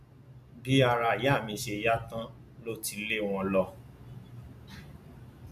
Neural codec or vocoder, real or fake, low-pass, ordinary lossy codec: none; real; 14.4 kHz; none